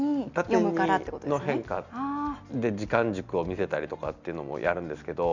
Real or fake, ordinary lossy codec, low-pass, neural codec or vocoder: real; none; 7.2 kHz; none